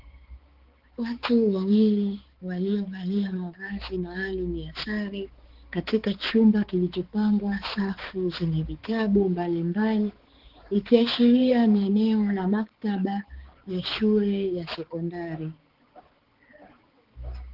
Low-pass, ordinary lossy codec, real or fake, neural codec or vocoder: 5.4 kHz; Opus, 16 kbps; fake; codec, 16 kHz, 4 kbps, X-Codec, HuBERT features, trained on balanced general audio